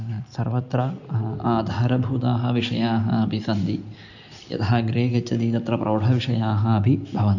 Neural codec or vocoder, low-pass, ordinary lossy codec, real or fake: none; 7.2 kHz; none; real